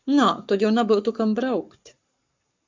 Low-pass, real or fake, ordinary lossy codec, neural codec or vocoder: 7.2 kHz; fake; MP3, 64 kbps; codec, 44.1 kHz, 7.8 kbps, Pupu-Codec